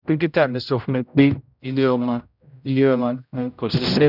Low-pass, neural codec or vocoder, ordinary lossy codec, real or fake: 5.4 kHz; codec, 16 kHz, 0.5 kbps, X-Codec, HuBERT features, trained on general audio; none; fake